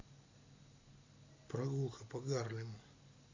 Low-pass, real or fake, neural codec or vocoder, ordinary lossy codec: 7.2 kHz; real; none; AAC, 32 kbps